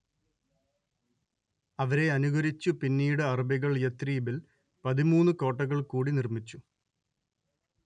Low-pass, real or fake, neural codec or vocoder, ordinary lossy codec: 9.9 kHz; real; none; none